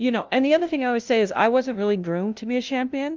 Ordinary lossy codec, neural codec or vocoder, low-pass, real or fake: Opus, 32 kbps; codec, 16 kHz, 0.5 kbps, FunCodec, trained on LibriTTS, 25 frames a second; 7.2 kHz; fake